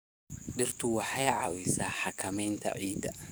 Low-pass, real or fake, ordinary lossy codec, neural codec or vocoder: none; fake; none; codec, 44.1 kHz, 7.8 kbps, DAC